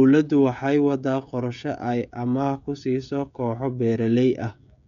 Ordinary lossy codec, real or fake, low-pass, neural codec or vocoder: none; fake; 7.2 kHz; codec, 16 kHz, 16 kbps, FreqCodec, smaller model